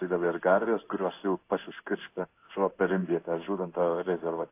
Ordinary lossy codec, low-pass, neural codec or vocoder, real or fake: AAC, 24 kbps; 3.6 kHz; codec, 16 kHz in and 24 kHz out, 1 kbps, XY-Tokenizer; fake